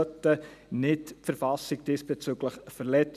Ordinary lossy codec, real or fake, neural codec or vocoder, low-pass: none; real; none; 14.4 kHz